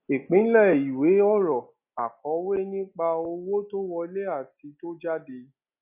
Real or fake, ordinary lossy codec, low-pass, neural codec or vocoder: real; none; 3.6 kHz; none